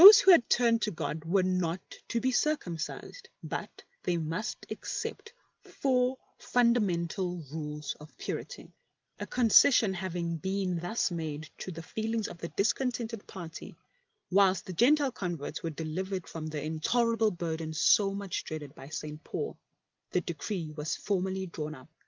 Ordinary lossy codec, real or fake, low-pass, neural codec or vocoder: Opus, 32 kbps; real; 7.2 kHz; none